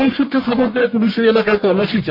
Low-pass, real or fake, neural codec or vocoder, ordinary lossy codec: 5.4 kHz; fake; codec, 44.1 kHz, 1.7 kbps, Pupu-Codec; MP3, 32 kbps